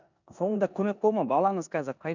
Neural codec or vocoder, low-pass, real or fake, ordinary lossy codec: codec, 16 kHz in and 24 kHz out, 0.9 kbps, LongCat-Audio-Codec, four codebook decoder; 7.2 kHz; fake; none